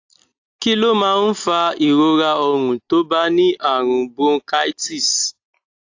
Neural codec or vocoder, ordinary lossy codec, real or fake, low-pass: none; AAC, 48 kbps; real; 7.2 kHz